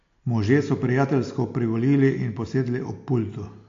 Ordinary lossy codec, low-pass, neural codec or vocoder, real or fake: AAC, 48 kbps; 7.2 kHz; none; real